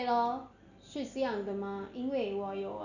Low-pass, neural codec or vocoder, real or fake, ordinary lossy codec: 7.2 kHz; none; real; none